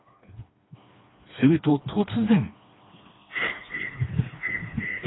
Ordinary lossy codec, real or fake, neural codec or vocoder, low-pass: AAC, 16 kbps; fake; codec, 16 kHz, 2 kbps, FreqCodec, smaller model; 7.2 kHz